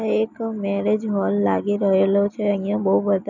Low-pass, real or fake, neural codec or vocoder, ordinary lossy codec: 7.2 kHz; real; none; none